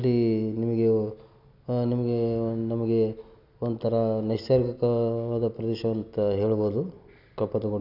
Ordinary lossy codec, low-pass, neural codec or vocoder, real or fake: none; 5.4 kHz; none; real